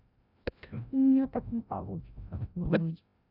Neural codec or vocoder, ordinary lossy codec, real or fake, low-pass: codec, 16 kHz, 0.5 kbps, FreqCodec, larger model; none; fake; 5.4 kHz